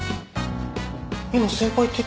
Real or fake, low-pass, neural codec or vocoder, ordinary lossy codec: real; none; none; none